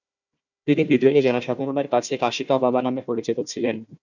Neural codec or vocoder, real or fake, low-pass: codec, 16 kHz, 1 kbps, FunCodec, trained on Chinese and English, 50 frames a second; fake; 7.2 kHz